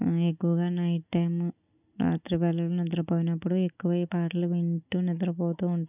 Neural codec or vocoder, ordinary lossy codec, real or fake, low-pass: none; none; real; 3.6 kHz